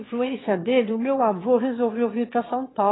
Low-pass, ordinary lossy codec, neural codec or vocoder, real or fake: 7.2 kHz; AAC, 16 kbps; autoencoder, 22.05 kHz, a latent of 192 numbers a frame, VITS, trained on one speaker; fake